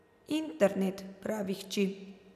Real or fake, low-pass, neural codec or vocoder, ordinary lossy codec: real; 14.4 kHz; none; none